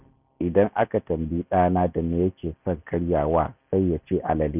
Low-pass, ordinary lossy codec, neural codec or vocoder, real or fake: 3.6 kHz; none; none; real